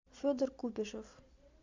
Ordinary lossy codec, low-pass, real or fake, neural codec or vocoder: MP3, 64 kbps; 7.2 kHz; real; none